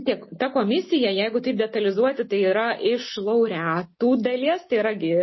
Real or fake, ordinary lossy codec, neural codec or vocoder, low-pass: real; MP3, 24 kbps; none; 7.2 kHz